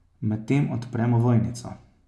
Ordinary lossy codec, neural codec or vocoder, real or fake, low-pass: none; none; real; none